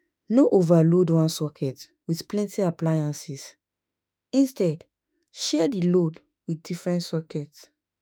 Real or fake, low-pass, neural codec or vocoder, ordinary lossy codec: fake; none; autoencoder, 48 kHz, 32 numbers a frame, DAC-VAE, trained on Japanese speech; none